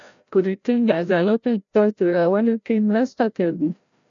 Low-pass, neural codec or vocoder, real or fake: 7.2 kHz; codec, 16 kHz, 0.5 kbps, FreqCodec, larger model; fake